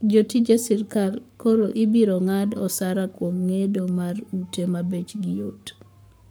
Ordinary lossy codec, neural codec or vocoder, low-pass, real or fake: none; codec, 44.1 kHz, 7.8 kbps, Pupu-Codec; none; fake